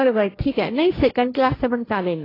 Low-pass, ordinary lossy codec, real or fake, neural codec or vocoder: 5.4 kHz; AAC, 24 kbps; fake; codec, 16 kHz, 1.1 kbps, Voila-Tokenizer